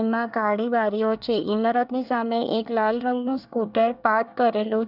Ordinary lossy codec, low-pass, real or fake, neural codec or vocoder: none; 5.4 kHz; fake; codec, 24 kHz, 1 kbps, SNAC